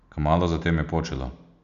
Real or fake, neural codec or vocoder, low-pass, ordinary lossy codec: real; none; 7.2 kHz; none